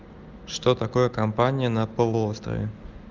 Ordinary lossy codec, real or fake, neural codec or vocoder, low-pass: Opus, 24 kbps; real; none; 7.2 kHz